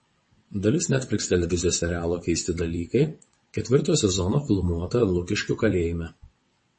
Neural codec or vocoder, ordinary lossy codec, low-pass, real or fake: vocoder, 22.05 kHz, 80 mel bands, WaveNeXt; MP3, 32 kbps; 9.9 kHz; fake